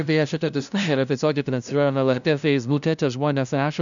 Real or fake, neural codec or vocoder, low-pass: fake; codec, 16 kHz, 0.5 kbps, FunCodec, trained on LibriTTS, 25 frames a second; 7.2 kHz